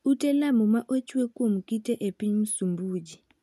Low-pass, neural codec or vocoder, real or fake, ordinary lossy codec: 14.4 kHz; none; real; none